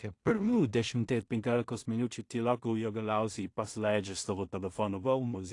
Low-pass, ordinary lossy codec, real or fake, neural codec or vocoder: 10.8 kHz; AAC, 48 kbps; fake; codec, 16 kHz in and 24 kHz out, 0.4 kbps, LongCat-Audio-Codec, two codebook decoder